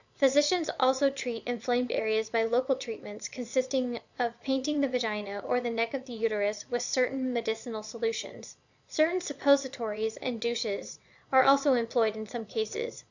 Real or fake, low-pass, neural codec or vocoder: fake; 7.2 kHz; vocoder, 22.05 kHz, 80 mel bands, Vocos